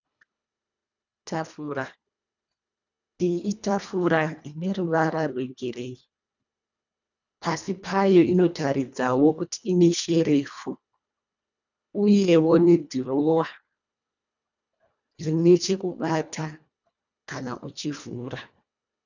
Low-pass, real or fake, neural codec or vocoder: 7.2 kHz; fake; codec, 24 kHz, 1.5 kbps, HILCodec